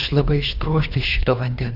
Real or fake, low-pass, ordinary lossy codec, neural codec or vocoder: fake; 5.4 kHz; AAC, 48 kbps; codec, 24 kHz, 3 kbps, HILCodec